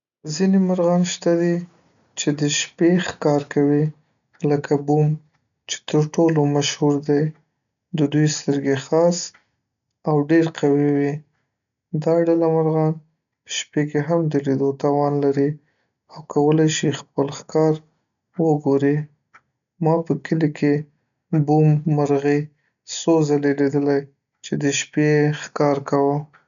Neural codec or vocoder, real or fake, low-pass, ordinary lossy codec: none; real; 7.2 kHz; none